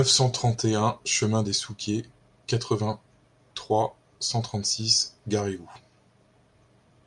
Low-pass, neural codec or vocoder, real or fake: 10.8 kHz; none; real